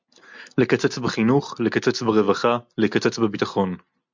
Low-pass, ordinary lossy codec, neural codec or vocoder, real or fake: 7.2 kHz; MP3, 64 kbps; none; real